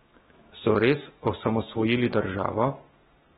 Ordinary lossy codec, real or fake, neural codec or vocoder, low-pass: AAC, 16 kbps; fake; autoencoder, 48 kHz, 128 numbers a frame, DAC-VAE, trained on Japanese speech; 19.8 kHz